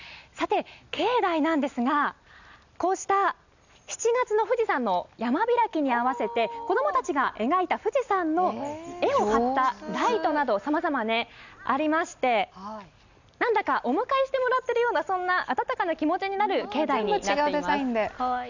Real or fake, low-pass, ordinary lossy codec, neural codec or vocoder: real; 7.2 kHz; none; none